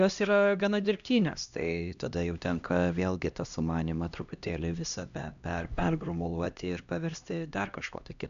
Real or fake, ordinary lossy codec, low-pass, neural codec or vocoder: fake; AAC, 96 kbps; 7.2 kHz; codec, 16 kHz, 1 kbps, X-Codec, HuBERT features, trained on LibriSpeech